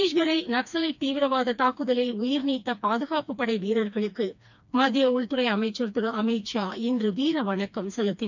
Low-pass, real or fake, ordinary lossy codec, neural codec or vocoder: 7.2 kHz; fake; none; codec, 16 kHz, 2 kbps, FreqCodec, smaller model